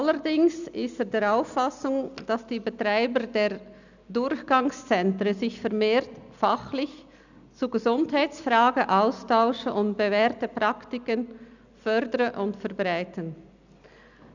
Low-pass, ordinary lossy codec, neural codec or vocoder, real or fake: 7.2 kHz; none; none; real